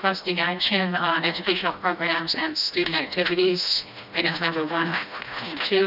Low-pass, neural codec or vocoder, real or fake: 5.4 kHz; codec, 16 kHz, 1 kbps, FreqCodec, smaller model; fake